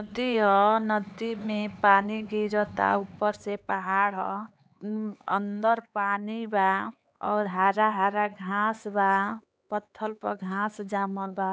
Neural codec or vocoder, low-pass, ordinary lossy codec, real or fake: codec, 16 kHz, 4 kbps, X-Codec, HuBERT features, trained on LibriSpeech; none; none; fake